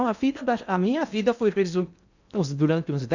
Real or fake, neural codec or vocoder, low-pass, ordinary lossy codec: fake; codec, 16 kHz in and 24 kHz out, 0.6 kbps, FocalCodec, streaming, 2048 codes; 7.2 kHz; none